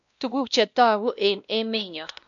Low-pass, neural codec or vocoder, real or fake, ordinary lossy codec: 7.2 kHz; codec, 16 kHz, 1 kbps, X-Codec, WavLM features, trained on Multilingual LibriSpeech; fake; none